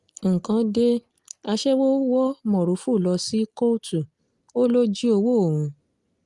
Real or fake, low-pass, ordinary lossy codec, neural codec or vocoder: real; 10.8 kHz; Opus, 32 kbps; none